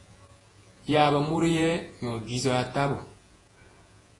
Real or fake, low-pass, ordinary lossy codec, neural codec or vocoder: fake; 10.8 kHz; AAC, 32 kbps; vocoder, 48 kHz, 128 mel bands, Vocos